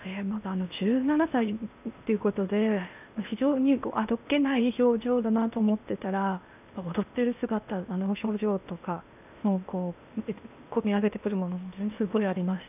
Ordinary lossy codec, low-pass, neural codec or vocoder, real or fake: none; 3.6 kHz; codec, 16 kHz in and 24 kHz out, 0.8 kbps, FocalCodec, streaming, 65536 codes; fake